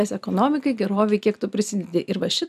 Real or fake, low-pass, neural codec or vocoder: real; 14.4 kHz; none